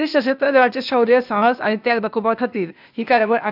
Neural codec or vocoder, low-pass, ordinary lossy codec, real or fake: codec, 16 kHz, 0.8 kbps, ZipCodec; 5.4 kHz; none; fake